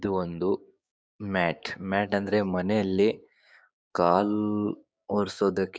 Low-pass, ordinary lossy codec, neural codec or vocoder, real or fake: none; none; codec, 16 kHz, 6 kbps, DAC; fake